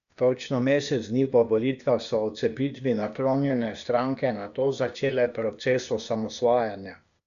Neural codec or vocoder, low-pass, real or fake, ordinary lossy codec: codec, 16 kHz, 0.8 kbps, ZipCodec; 7.2 kHz; fake; none